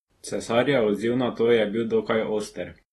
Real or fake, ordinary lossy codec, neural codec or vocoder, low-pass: real; AAC, 32 kbps; none; 19.8 kHz